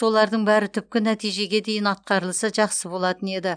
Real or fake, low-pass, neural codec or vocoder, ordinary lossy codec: real; 9.9 kHz; none; none